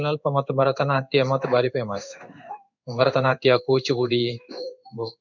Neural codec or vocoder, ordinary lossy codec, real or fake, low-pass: codec, 16 kHz in and 24 kHz out, 1 kbps, XY-Tokenizer; none; fake; 7.2 kHz